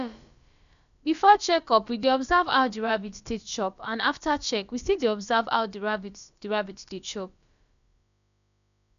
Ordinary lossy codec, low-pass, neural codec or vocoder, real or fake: none; 7.2 kHz; codec, 16 kHz, about 1 kbps, DyCAST, with the encoder's durations; fake